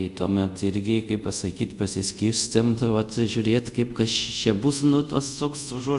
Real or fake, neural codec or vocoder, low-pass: fake; codec, 24 kHz, 0.5 kbps, DualCodec; 10.8 kHz